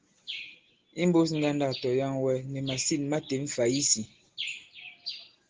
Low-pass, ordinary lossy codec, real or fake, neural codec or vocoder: 7.2 kHz; Opus, 16 kbps; real; none